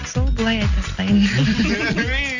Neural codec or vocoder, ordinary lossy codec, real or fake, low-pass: none; none; real; 7.2 kHz